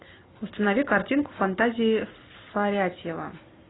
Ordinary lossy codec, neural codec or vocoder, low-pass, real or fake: AAC, 16 kbps; none; 7.2 kHz; real